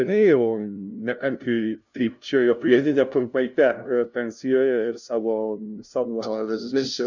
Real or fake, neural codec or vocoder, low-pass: fake; codec, 16 kHz, 0.5 kbps, FunCodec, trained on LibriTTS, 25 frames a second; 7.2 kHz